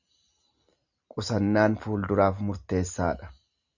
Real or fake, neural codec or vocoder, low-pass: real; none; 7.2 kHz